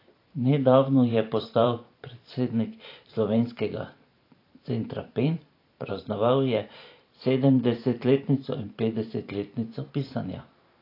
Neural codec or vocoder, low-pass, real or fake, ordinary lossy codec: vocoder, 44.1 kHz, 128 mel bands every 512 samples, BigVGAN v2; 5.4 kHz; fake; AAC, 32 kbps